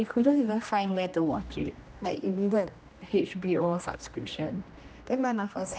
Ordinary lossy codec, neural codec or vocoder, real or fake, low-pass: none; codec, 16 kHz, 1 kbps, X-Codec, HuBERT features, trained on general audio; fake; none